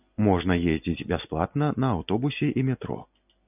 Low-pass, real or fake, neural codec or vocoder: 3.6 kHz; real; none